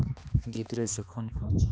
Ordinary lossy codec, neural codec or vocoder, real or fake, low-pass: none; codec, 16 kHz, 2 kbps, X-Codec, HuBERT features, trained on balanced general audio; fake; none